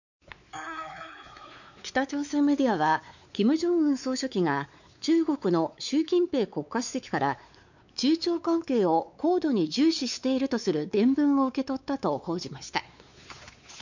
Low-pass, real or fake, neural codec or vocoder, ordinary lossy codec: 7.2 kHz; fake; codec, 16 kHz, 4 kbps, X-Codec, WavLM features, trained on Multilingual LibriSpeech; none